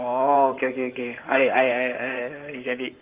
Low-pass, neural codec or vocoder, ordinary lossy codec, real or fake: 3.6 kHz; codec, 16 kHz in and 24 kHz out, 2.2 kbps, FireRedTTS-2 codec; Opus, 32 kbps; fake